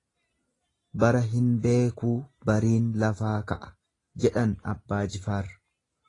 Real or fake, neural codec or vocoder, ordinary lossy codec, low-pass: real; none; AAC, 32 kbps; 10.8 kHz